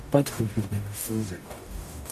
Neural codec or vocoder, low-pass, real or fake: codec, 44.1 kHz, 0.9 kbps, DAC; 14.4 kHz; fake